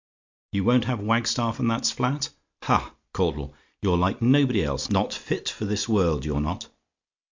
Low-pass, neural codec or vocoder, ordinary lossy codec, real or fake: 7.2 kHz; none; MP3, 64 kbps; real